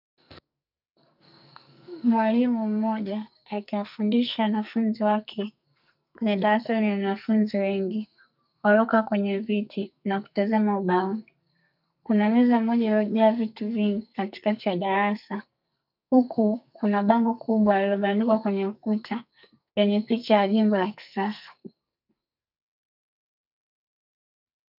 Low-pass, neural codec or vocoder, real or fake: 5.4 kHz; codec, 44.1 kHz, 2.6 kbps, SNAC; fake